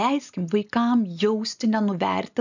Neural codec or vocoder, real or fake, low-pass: none; real; 7.2 kHz